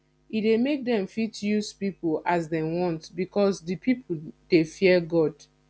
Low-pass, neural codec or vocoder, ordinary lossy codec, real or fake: none; none; none; real